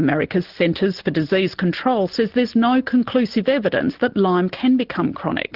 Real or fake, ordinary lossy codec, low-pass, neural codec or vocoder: real; Opus, 16 kbps; 5.4 kHz; none